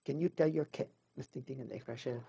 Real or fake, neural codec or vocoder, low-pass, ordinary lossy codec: fake; codec, 16 kHz, 0.4 kbps, LongCat-Audio-Codec; none; none